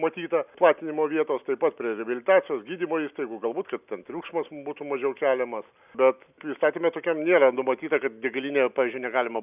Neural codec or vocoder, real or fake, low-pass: none; real; 3.6 kHz